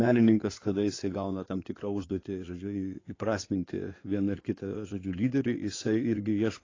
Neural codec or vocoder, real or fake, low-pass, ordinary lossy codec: codec, 16 kHz in and 24 kHz out, 2.2 kbps, FireRedTTS-2 codec; fake; 7.2 kHz; AAC, 32 kbps